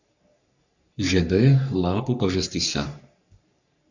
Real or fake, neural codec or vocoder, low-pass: fake; codec, 44.1 kHz, 3.4 kbps, Pupu-Codec; 7.2 kHz